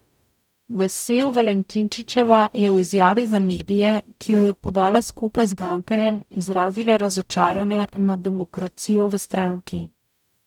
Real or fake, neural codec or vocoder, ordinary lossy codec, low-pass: fake; codec, 44.1 kHz, 0.9 kbps, DAC; none; 19.8 kHz